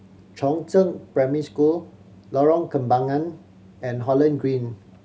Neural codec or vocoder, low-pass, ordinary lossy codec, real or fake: none; none; none; real